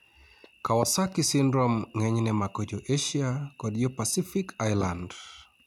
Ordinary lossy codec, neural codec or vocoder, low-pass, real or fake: none; none; 19.8 kHz; real